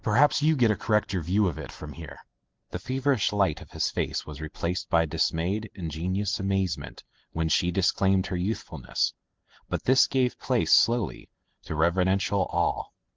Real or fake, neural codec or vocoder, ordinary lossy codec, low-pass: real; none; Opus, 16 kbps; 7.2 kHz